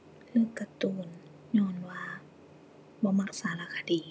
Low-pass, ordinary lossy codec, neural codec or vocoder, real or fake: none; none; none; real